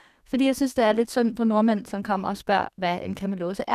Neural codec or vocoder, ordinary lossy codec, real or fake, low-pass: codec, 32 kHz, 1.9 kbps, SNAC; none; fake; 14.4 kHz